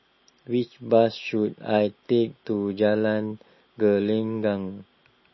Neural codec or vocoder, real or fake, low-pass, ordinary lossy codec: none; real; 7.2 kHz; MP3, 24 kbps